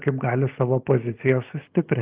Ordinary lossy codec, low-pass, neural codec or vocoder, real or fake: Opus, 32 kbps; 3.6 kHz; none; real